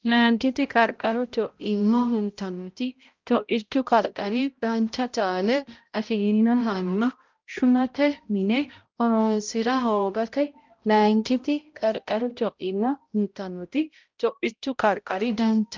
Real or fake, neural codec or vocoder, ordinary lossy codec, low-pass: fake; codec, 16 kHz, 0.5 kbps, X-Codec, HuBERT features, trained on balanced general audio; Opus, 32 kbps; 7.2 kHz